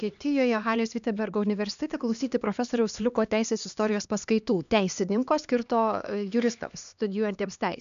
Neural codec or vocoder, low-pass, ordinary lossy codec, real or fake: codec, 16 kHz, 2 kbps, X-Codec, HuBERT features, trained on LibriSpeech; 7.2 kHz; MP3, 96 kbps; fake